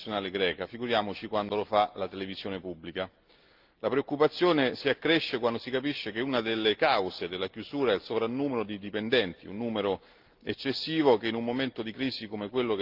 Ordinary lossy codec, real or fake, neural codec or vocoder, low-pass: Opus, 32 kbps; real; none; 5.4 kHz